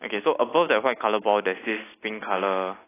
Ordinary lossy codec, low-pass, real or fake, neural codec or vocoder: AAC, 16 kbps; 3.6 kHz; real; none